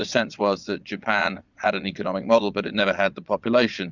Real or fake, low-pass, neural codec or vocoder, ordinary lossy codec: fake; 7.2 kHz; vocoder, 22.05 kHz, 80 mel bands, Vocos; Opus, 64 kbps